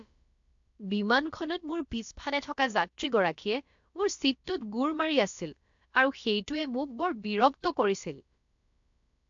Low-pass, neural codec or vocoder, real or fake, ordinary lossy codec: 7.2 kHz; codec, 16 kHz, about 1 kbps, DyCAST, with the encoder's durations; fake; AAC, 64 kbps